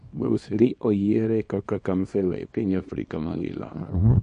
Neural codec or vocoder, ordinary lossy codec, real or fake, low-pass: codec, 24 kHz, 0.9 kbps, WavTokenizer, small release; MP3, 48 kbps; fake; 10.8 kHz